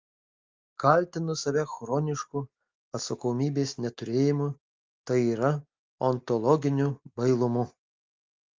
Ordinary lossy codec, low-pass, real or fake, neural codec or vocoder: Opus, 32 kbps; 7.2 kHz; real; none